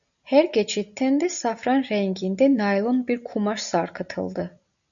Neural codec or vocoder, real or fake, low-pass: none; real; 7.2 kHz